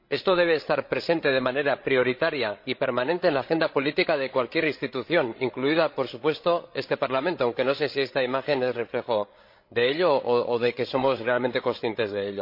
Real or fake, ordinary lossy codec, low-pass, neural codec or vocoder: fake; MP3, 32 kbps; 5.4 kHz; codec, 16 kHz, 16 kbps, FreqCodec, larger model